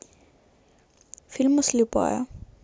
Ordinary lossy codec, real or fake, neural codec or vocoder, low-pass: none; real; none; none